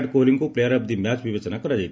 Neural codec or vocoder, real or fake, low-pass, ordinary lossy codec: none; real; none; none